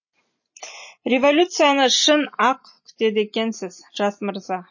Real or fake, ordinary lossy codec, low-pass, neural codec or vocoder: real; MP3, 32 kbps; 7.2 kHz; none